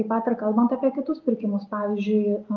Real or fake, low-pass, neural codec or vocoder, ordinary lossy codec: real; 7.2 kHz; none; Opus, 24 kbps